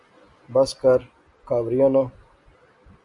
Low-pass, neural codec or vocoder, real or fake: 10.8 kHz; none; real